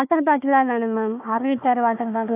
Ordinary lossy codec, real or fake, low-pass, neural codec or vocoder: AAC, 32 kbps; fake; 3.6 kHz; codec, 16 kHz, 1 kbps, FunCodec, trained on Chinese and English, 50 frames a second